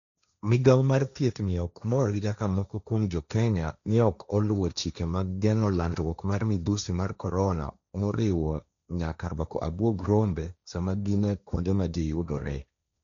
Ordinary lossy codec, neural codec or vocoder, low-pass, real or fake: none; codec, 16 kHz, 1.1 kbps, Voila-Tokenizer; 7.2 kHz; fake